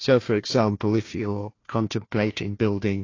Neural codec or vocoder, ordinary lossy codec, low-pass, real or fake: codec, 16 kHz, 1 kbps, FunCodec, trained on Chinese and English, 50 frames a second; AAC, 32 kbps; 7.2 kHz; fake